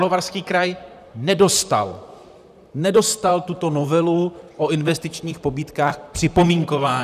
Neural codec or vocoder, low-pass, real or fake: vocoder, 44.1 kHz, 128 mel bands, Pupu-Vocoder; 14.4 kHz; fake